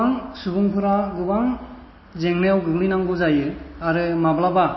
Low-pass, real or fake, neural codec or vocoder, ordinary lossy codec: 7.2 kHz; real; none; MP3, 24 kbps